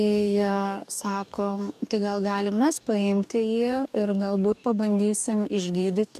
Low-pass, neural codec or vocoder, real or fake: 14.4 kHz; codec, 44.1 kHz, 2.6 kbps, DAC; fake